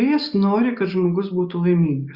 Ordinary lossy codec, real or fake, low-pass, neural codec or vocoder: Opus, 64 kbps; real; 5.4 kHz; none